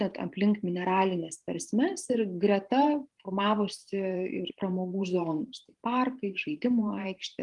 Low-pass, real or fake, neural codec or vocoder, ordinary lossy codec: 10.8 kHz; fake; vocoder, 44.1 kHz, 128 mel bands every 512 samples, BigVGAN v2; Opus, 32 kbps